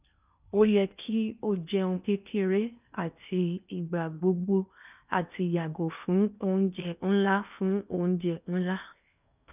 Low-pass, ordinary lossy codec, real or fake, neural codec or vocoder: 3.6 kHz; none; fake; codec, 16 kHz in and 24 kHz out, 0.8 kbps, FocalCodec, streaming, 65536 codes